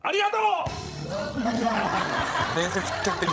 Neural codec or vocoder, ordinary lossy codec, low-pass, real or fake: codec, 16 kHz, 16 kbps, FreqCodec, larger model; none; none; fake